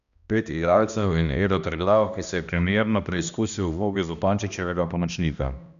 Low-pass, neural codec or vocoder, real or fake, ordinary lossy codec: 7.2 kHz; codec, 16 kHz, 1 kbps, X-Codec, HuBERT features, trained on balanced general audio; fake; none